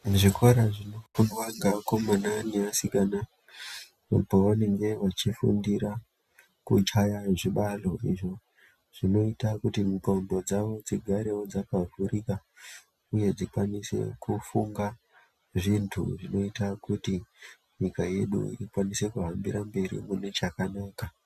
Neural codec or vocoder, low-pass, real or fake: none; 14.4 kHz; real